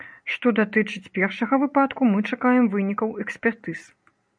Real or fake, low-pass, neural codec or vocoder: real; 9.9 kHz; none